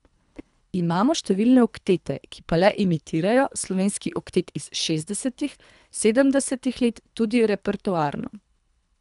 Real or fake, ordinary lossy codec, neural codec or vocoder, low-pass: fake; none; codec, 24 kHz, 3 kbps, HILCodec; 10.8 kHz